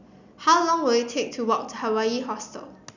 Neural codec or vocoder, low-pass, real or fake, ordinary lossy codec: none; 7.2 kHz; real; none